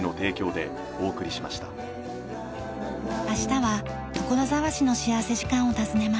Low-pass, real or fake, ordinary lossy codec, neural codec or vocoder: none; real; none; none